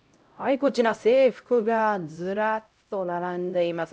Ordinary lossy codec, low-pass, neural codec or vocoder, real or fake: none; none; codec, 16 kHz, 0.5 kbps, X-Codec, HuBERT features, trained on LibriSpeech; fake